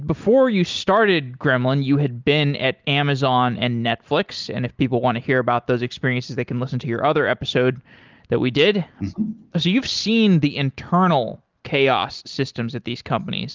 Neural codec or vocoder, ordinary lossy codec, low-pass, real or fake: none; Opus, 24 kbps; 7.2 kHz; real